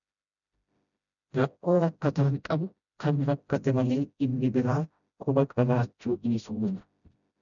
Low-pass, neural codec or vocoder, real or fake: 7.2 kHz; codec, 16 kHz, 0.5 kbps, FreqCodec, smaller model; fake